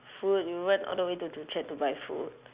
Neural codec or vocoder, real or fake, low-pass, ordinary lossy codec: none; real; 3.6 kHz; Opus, 64 kbps